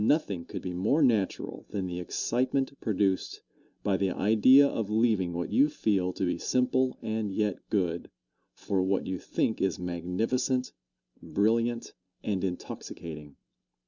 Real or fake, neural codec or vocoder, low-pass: real; none; 7.2 kHz